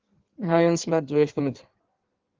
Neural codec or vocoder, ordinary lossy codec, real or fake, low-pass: codec, 16 kHz in and 24 kHz out, 1.1 kbps, FireRedTTS-2 codec; Opus, 16 kbps; fake; 7.2 kHz